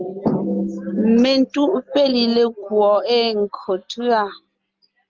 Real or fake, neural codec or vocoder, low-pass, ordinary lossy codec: real; none; 7.2 kHz; Opus, 32 kbps